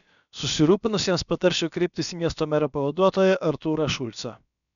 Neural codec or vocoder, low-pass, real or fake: codec, 16 kHz, about 1 kbps, DyCAST, with the encoder's durations; 7.2 kHz; fake